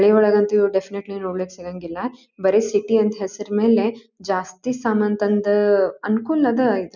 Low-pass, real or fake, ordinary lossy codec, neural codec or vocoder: 7.2 kHz; real; none; none